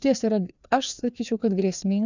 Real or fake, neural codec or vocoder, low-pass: fake; codec, 16 kHz, 2 kbps, FreqCodec, larger model; 7.2 kHz